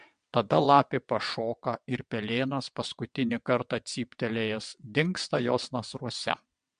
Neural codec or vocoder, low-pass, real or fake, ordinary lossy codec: vocoder, 22.05 kHz, 80 mel bands, WaveNeXt; 9.9 kHz; fake; MP3, 64 kbps